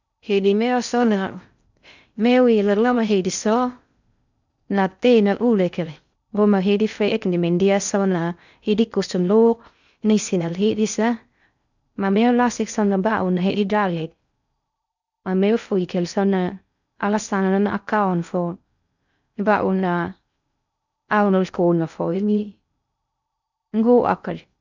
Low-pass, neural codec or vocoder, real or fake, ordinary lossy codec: 7.2 kHz; codec, 16 kHz in and 24 kHz out, 0.6 kbps, FocalCodec, streaming, 2048 codes; fake; none